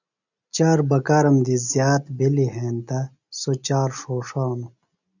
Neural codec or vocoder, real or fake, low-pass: none; real; 7.2 kHz